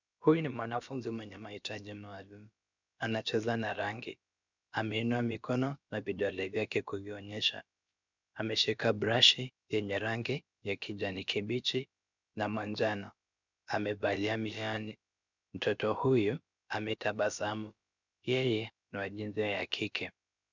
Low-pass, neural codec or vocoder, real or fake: 7.2 kHz; codec, 16 kHz, about 1 kbps, DyCAST, with the encoder's durations; fake